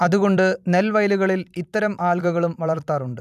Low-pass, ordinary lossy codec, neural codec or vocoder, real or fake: 14.4 kHz; none; vocoder, 44.1 kHz, 128 mel bands every 256 samples, BigVGAN v2; fake